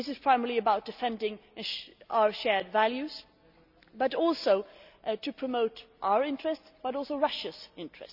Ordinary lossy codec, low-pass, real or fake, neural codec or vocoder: none; 5.4 kHz; real; none